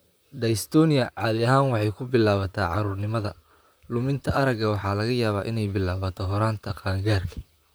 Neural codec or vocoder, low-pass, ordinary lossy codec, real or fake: vocoder, 44.1 kHz, 128 mel bands, Pupu-Vocoder; none; none; fake